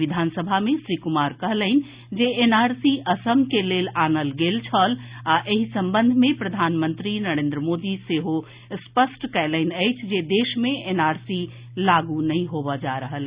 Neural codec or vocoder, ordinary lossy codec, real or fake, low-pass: none; Opus, 64 kbps; real; 3.6 kHz